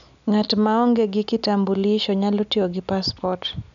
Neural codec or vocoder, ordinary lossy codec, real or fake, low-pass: none; none; real; 7.2 kHz